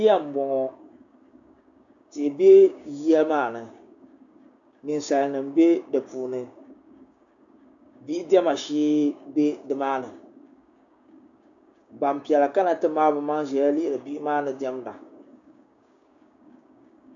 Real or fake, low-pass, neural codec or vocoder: fake; 7.2 kHz; codec, 16 kHz, 6 kbps, DAC